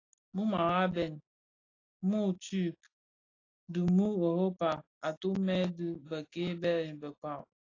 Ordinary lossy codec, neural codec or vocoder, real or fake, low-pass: AAC, 32 kbps; none; real; 7.2 kHz